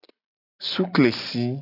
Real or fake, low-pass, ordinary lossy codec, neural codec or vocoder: real; 5.4 kHz; AAC, 48 kbps; none